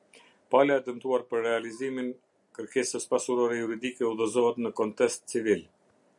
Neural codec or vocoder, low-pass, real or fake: none; 10.8 kHz; real